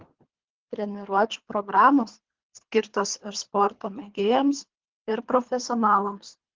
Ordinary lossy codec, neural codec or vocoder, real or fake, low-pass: Opus, 16 kbps; codec, 24 kHz, 3 kbps, HILCodec; fake; 7.2 kHz